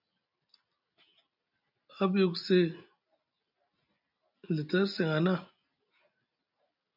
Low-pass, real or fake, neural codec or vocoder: 5.4 kHz; real; none